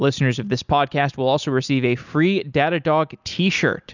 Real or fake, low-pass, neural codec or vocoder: real; 7.2 kHz; none